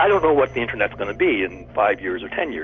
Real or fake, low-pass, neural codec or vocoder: real; 7.2 kHz; none